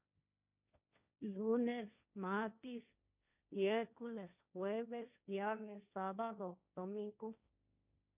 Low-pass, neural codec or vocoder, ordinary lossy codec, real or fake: 3.6 kHz; codec, 16 kHz, 1.1 kbps, Voila-Tokenizer; none; fake